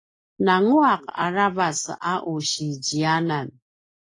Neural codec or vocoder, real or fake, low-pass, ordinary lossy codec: none; real; 10.8 kHz; AAC, 32 kbps